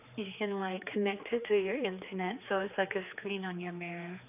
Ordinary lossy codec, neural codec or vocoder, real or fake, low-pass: none; codec, 16 kHz, 4 kbps, X-Codec, HuBERT features, trained on general audio; fake; 3.6 kHz